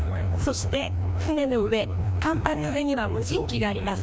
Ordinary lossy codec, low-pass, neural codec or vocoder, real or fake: none; none; codec, 16 kHz, 1 kbps, FreqCodec, larger model; fake